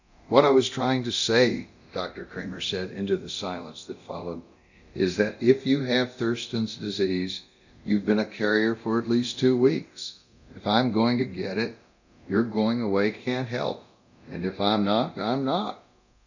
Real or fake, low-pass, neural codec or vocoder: fake; 7.2 kHz; codec, 24 kHz, 0.9 kbps, DualCodec